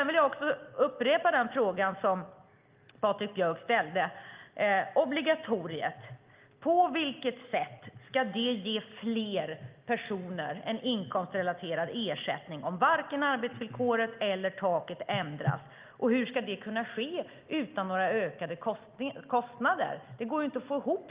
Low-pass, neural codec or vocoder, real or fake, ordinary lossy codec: 3.6 kHz; none; real; Opus, 32 kbps